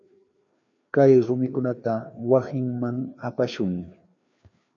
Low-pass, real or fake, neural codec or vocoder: 7.2 kHz; fake; codec, 16 kHz, 2 kbps, FreqCodec, larger model